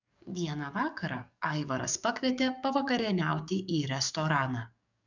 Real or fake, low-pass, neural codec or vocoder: fake; 7.2 kHz; codec, 44.1 kHz, 7.8 kbps, DAC